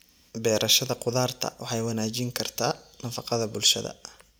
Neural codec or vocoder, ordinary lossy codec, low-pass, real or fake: none; none; none; real